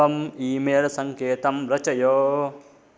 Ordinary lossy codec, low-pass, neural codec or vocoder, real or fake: none; none; none; real